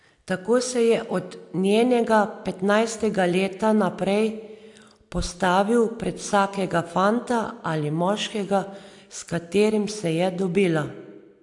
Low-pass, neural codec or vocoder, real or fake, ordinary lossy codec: 10.8 kHz; none; real; AAC, 64 kbps